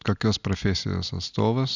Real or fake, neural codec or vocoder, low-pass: real; none; 7.2 kHz